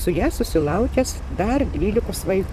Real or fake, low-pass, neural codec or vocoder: fake; 14.4 kHz; vocoder, 44.1 kHz, 128 mel bands, Pupu-Vocoder